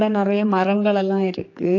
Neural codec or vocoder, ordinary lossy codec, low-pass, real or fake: codec, 16 kHz, 4 kbps, X-Codec, HuBERT features, trained on general audio; AAC, 48 kbps; 7.2 kHz; fake